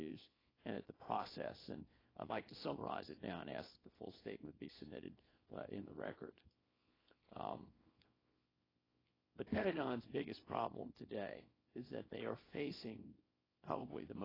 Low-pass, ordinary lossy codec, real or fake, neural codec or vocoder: 5.4 kHz; AAC, 24 kbps; fake; codec, 24 kHz, 0.9 kbps, WavTokenizer, small release